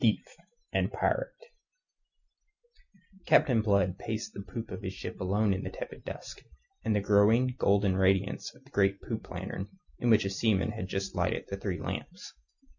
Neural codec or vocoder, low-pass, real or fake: none; 7.2 kHz; real